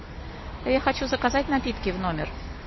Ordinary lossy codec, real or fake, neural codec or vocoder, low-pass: MP3, 24 kbps; real; none; 7.2 kHz